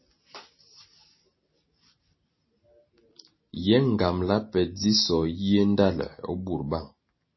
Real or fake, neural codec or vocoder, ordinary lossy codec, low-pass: real; none; MP3, 24 kbps; 7.2 kHz